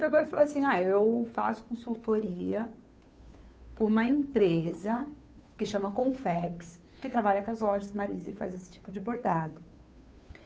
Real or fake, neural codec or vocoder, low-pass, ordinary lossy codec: fake; codec, 16 kHz, 2 kbps, FunCodec, trained on Chinese and English, 25 frames a second; none; none